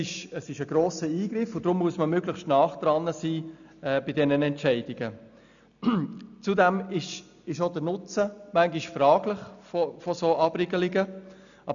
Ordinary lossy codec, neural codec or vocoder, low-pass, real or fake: none; none; 7.2 kHz; real